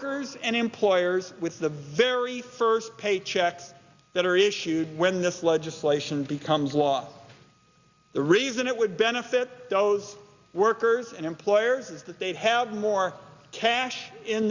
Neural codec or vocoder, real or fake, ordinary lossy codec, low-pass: none; real; Opus, 64 kbps; 7.2 kHz